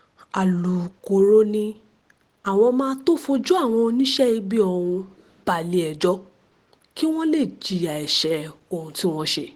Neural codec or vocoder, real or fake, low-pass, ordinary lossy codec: none; real; 19.8 kHz; Opus, 16 kbps